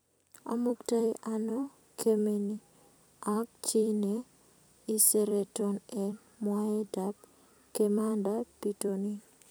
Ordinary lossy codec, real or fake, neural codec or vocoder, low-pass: none; fake; vocoder, 44.1 kHz, 128 mel bands every 256 samples, BigVGAN v2; none